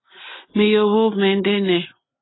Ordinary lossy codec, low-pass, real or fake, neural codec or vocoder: AAC, 16 kbps; 7.2 kHz; real; none